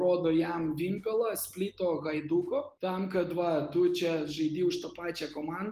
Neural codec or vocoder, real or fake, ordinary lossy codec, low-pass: none; real; Opus, 24 kbps; 14.4 kHz